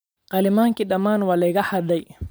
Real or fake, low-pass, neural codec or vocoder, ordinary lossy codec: real; none; none; none